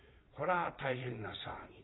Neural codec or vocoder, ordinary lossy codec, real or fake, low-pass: vocoder, 22.05 kHz, 80 mel bands, Vocos; AAC, 16 kbps; fake; 7.2 kHz